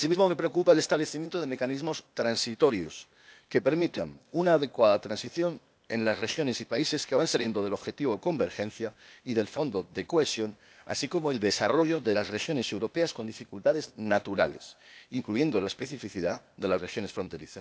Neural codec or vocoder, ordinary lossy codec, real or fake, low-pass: codec, 16 kHz, 0.8 kbps, ZipCodec; none; fake; none